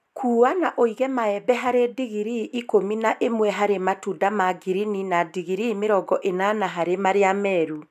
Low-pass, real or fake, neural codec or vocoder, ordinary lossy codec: 14.4 kHz; real; none; none